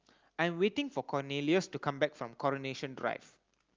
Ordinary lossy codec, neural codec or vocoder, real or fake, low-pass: Opus, 24 kbps; none; real; 7.2 kHz